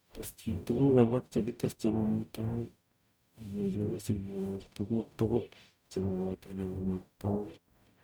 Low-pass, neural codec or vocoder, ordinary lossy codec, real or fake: none; codec, 44.1 kHz, 0.9 kbps, DAC; none; fake